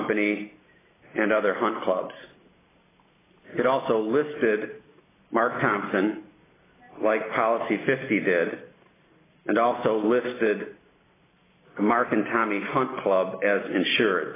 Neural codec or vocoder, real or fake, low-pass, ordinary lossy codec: none; real; 3.6 kHz; AAC, 16 kbps